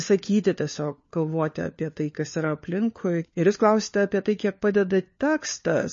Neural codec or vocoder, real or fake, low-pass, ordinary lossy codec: codec, 16 kHz, 4 kbps, FunCodec, trained on LibriTTS, 50 frames a second; fake; 7.2 kHz; MP3, 32 kbps